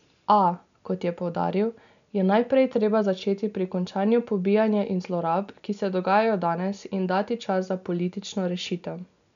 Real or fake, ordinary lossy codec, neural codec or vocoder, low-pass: real; MP3, 96 kbps; none; 7.2 kHz